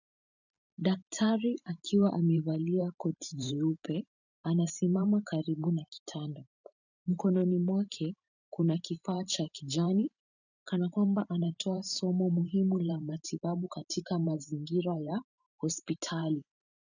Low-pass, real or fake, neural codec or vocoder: 7.2 kHz; fake; vocoder, 44.1 kHz, 128 mel bands every 512 samples, BigVGAN v2